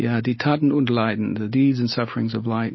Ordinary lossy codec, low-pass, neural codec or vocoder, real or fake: MP3, 24 kbps; 7.2 kHz; none; real